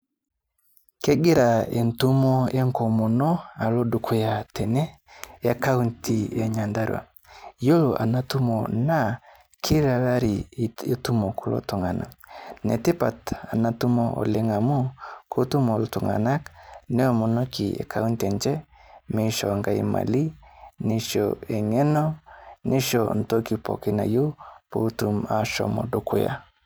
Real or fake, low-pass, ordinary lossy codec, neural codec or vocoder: real; none; none; none